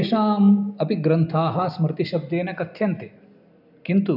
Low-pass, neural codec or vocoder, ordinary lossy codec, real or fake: 5.4 kHz; none; none; real